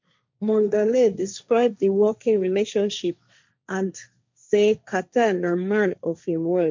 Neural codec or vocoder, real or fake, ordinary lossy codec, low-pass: codec, 16 kHz, 1.1 kbps, Voila-Tokenizer; fake; MP3, 64 kbps; 7.2 kHz